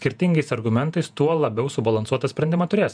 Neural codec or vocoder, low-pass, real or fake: vocoder, 48 kHz, 128 mel bands, Vocos; 9.9 kHz; fake